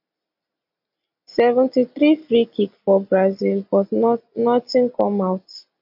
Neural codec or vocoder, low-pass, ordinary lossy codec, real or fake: none; 5.4 kHz; AAC, 48 kbps; real